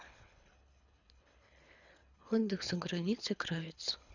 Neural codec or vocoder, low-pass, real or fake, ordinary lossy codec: codec, 24 kHz, 3 kbps, HILCodec; 7.2 kHz; fake; none